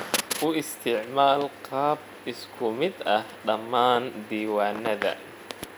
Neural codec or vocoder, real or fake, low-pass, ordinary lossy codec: none; real; none; none